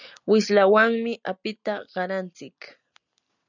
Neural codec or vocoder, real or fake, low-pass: none; real; 7.2 kHz